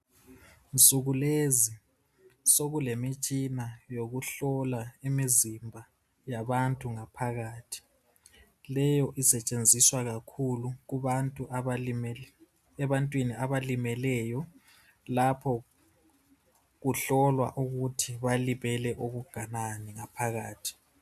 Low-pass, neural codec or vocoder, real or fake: 14.4 kHz; none; real